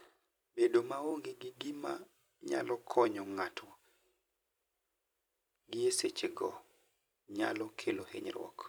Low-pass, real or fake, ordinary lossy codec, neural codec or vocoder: none; fake; none; vocoder, 44.1 kHz, 128 mel bands every 256 samples, BigVGAN v2